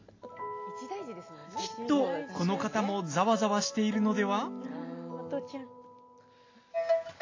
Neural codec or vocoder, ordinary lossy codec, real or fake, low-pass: none; AAC, 32 kbps; real; 7.2 kHz